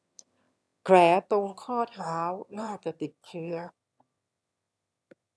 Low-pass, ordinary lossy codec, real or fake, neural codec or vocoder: none; none; fake; autoencoder, 22.05 kHz, a latent of 192 numbers a frame, VITS, trained on one speaker